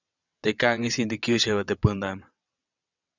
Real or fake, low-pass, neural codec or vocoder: fake; 7.2 kHz; vocoder, 22.05 kHz, 80 mel bands, WaveNeXt